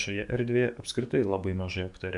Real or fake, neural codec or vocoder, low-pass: fake; codec, 44.1 kHz, 7.8 kbps, DAC; 10.8 kHz